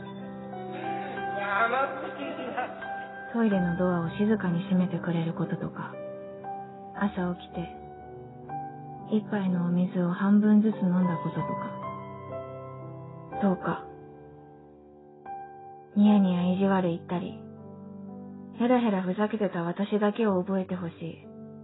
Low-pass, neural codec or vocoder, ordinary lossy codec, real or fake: 7.2 kHz; none; AAC, 16 kbps; real